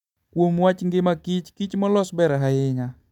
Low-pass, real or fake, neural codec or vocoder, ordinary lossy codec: 19.8 kHz; real; none; none